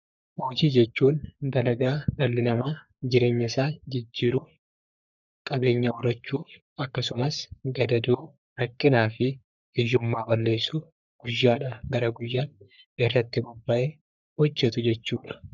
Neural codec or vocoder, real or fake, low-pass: codec, 44.1 kHz, 3.4 kbps, Pupu-Codec; fake; 7.2 kHz